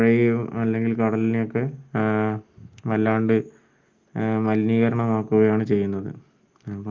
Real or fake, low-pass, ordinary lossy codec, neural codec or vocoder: real; 7.2 kHz; Opus, 32 kbps; none